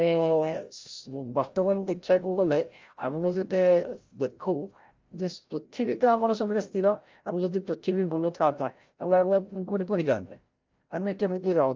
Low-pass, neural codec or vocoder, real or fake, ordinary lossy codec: 7.2 kHz; codec, 16 kHz, 0.5 kbps, FreqCodec, larger model; fake; Opus, 32 kbps